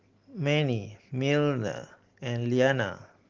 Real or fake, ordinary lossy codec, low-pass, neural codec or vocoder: real; Opus, 16 kbps; 7.2 kHz; none